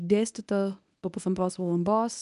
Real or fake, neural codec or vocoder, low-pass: fake; codec, 24 kHz, 0.9 kbps, WavTokenizer, medium speech release version 1; 10.8 kHz